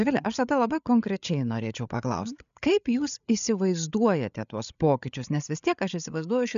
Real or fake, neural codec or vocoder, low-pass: fake; codec, 16 kHz, 8 kbps, FreqCodec, larger model; 7.2 kHz